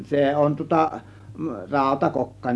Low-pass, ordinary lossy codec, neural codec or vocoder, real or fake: none; none; none; real